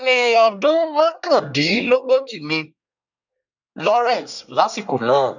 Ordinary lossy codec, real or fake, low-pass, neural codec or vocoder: none; fake; 7.2 kHz; codec, 24 kHz, 1 kbps, SNAC